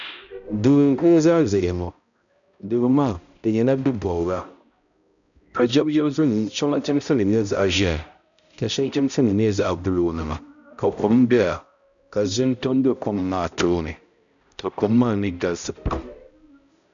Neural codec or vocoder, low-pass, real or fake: codec, 16 kHz, 0.5 kbps, X-Codec, HuBERT features, trained on balanced general audio; 7.2 kHz; fake